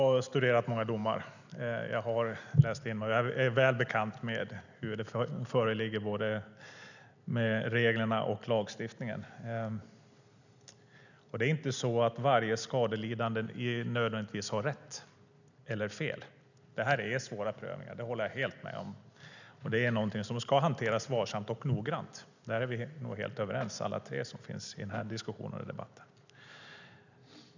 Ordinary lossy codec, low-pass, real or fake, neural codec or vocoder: none; 7.2 kHz; real; none